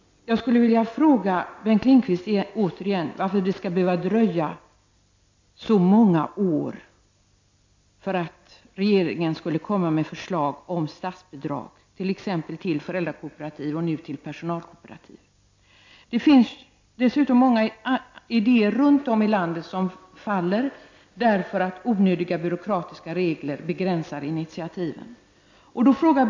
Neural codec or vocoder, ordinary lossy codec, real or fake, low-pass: none; MP3, 48 kbps; real; 7.2 kHz